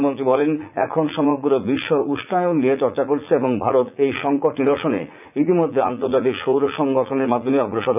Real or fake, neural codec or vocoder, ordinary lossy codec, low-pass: fake; vocoder, 44.1 kHz, 80 mel bands, Vocos; none; 3.6 kHz